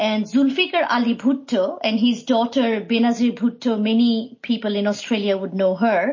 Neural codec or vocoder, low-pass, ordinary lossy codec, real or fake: none; 7.2 kHz; MP3, 32 kbps; real